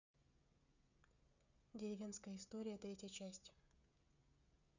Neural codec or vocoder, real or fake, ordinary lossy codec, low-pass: codec, 16 kHz, 16 kbps, FreqCodec, smaller model; fake; none; 7.2 kHz